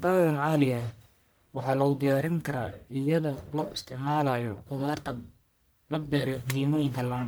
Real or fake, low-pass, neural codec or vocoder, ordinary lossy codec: fake; none; codec, 44.1 kHz, 1.7 kbps, Pupu-Codec; none